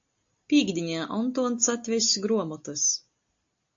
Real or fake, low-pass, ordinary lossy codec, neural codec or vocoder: real; 7.2 kHz; AAC, 48 kbps; none